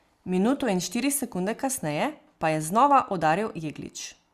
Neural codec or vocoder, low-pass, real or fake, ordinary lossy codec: none; 14.4 kHz; real; Opus, 64 kbps